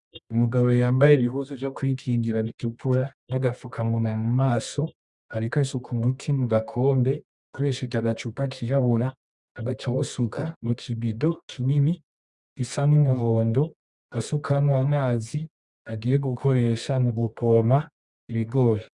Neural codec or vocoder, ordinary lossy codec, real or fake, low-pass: codec, 24 kHz, 0.9 kbps, WavTokenizer, medium music audio release; Opus, 64 kbps; fake; 10.8 kHz